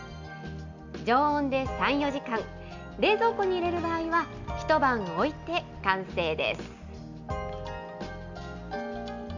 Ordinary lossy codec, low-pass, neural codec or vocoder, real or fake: none; 7.2 kHz; none; real